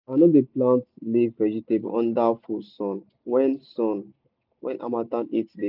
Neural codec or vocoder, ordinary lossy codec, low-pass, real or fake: none; none; 5.4 kHz; real